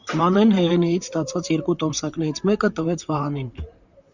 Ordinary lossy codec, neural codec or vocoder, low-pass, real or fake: Opus, 64 kbps; vocoder, 44.1 kHz, 128 mel bands, Pupu-Vocoder; 7.2 kHz; fake